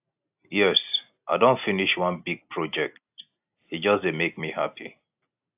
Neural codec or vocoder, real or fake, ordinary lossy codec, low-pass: none; real; AAC, 32 kbps; 3.6 kHz